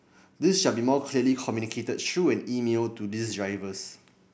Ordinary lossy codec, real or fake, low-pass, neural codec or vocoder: none; real; none; none